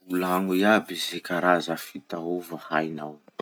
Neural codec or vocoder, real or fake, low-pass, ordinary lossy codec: none; real; none; none